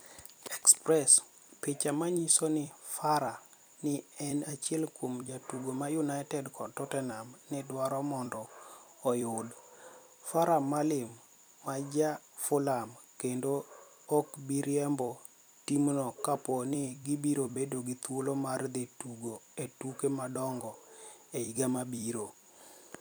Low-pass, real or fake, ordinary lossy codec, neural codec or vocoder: none; fake; none; vocoder, 44.1 kHz, 128 mel bands every 256 samples, BigVGAN v2